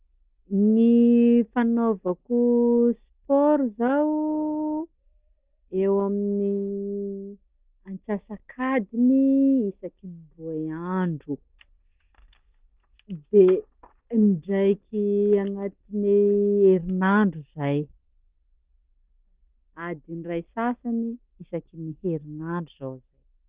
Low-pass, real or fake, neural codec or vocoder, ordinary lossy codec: 3.6 kHz; real; none; Opus, 32 kbps